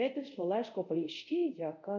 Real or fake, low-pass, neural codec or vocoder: fake; 7.2 kHz; codec, 24 kHz, 0.5 kbps, DualCodec